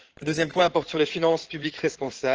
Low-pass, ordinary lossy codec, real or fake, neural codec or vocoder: 7.2 kHz; Opus, 16 kbps; fake; codec, 16 kHz in and 24 kHz out, 2.2 kbps, FireRedTTS-2 codec